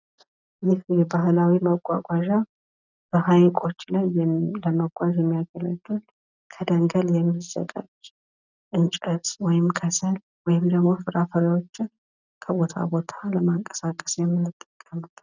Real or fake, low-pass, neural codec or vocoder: real; 7.2 kHz; none